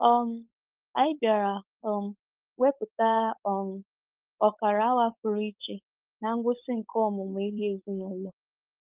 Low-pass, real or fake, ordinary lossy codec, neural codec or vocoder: 3.6 kHz; fake; Opus, 32 kbps; codec, 16 kHz, 4.8 kbps, FACodec